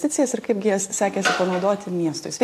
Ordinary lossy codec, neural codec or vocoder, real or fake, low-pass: AAC, 64 kbps; none; real; 14.4 kHz